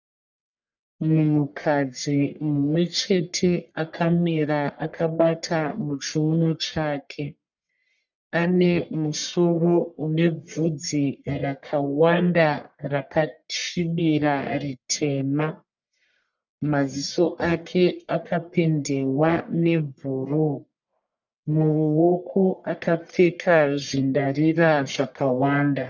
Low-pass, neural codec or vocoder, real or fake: 7.2 kHz; codec, 44.1 kHz, 1.7 kbps, Pupu-Codec; fake